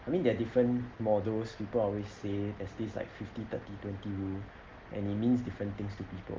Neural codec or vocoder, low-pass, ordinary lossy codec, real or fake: none; 7.2 kHz; Opus, 16 kbps; real